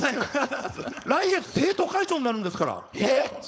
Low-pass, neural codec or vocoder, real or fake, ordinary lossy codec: none; codec, 16 kHz, 4.8 kbps, FACodec; fake; none